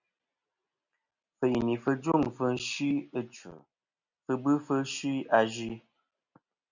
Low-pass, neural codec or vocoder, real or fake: 7.2 kHz; none; real